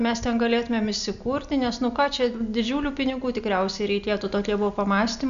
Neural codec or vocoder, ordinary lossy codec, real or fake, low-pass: none; MP3, 96 kbps; real; 7.2 kHz